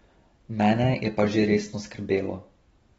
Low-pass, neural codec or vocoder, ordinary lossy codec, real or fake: 19.8 kHz; none; AAC, 24 kbps; real